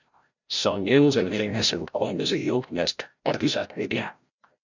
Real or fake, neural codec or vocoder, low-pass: fake; codec, 16 kHz, 0.5 kbps, FreqCodec, larger model; 7.2 kHz